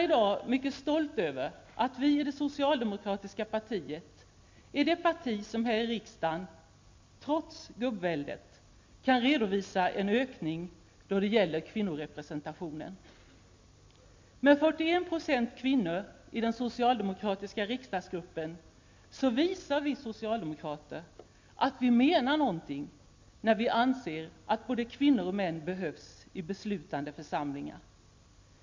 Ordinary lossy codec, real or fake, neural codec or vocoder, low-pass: MP3, 48 kbps; real; none; 7.2 kHz